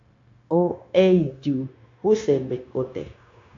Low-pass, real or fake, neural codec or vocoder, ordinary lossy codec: 7.2 kHz; fake; codec, 16 kHz, 0.9 kbps, LongCat-Audio-Codec; AAC, 48 kbps